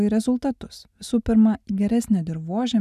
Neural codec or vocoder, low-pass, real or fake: none; 14.4 kHz; real